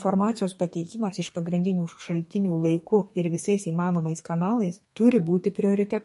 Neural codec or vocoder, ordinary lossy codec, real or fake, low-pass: codec, 32 kHz, 1.9 kbps, SNAC; MP3, 48 kbps; fake; 14.4 kHz